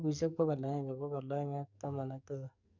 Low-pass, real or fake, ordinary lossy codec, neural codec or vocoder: 7.2 kHz; fake; none; codec, 44.1 kHz, 2.6 kbps, SNAC